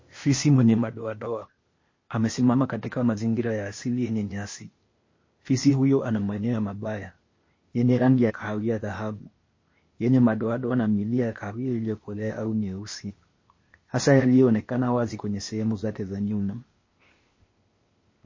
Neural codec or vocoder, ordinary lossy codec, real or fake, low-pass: codec, 16 kHz, 0.8 kbps, ZipCodec; MP3, 32 kbps; fake; 7.2 kHz